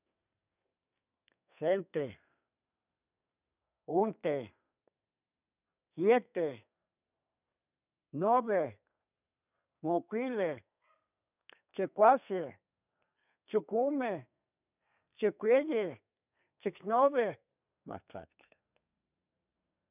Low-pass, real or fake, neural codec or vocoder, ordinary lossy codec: 3.6 kHz; fake; codec, 32 kHz, 1.9 kbps, SNAC; none